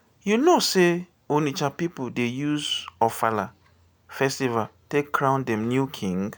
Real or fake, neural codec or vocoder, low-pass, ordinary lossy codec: real; none; none; none